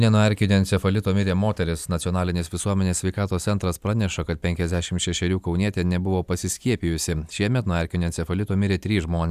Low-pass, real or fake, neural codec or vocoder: 14.4 kHz; real; none